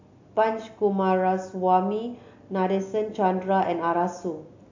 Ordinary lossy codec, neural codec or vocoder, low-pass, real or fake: AAC, 48 kbps; none; 7.2 kHz; real